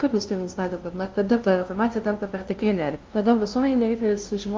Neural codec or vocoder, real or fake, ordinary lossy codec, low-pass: codec, 16 kHz, 0.5 kbps, FunCodec, trained on LibriTTS, 25 frames a second; fake; Opus, 32 kbps; 7.2 kHz